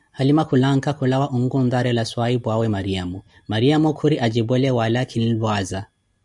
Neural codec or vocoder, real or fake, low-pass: none; real; 10.8 kHz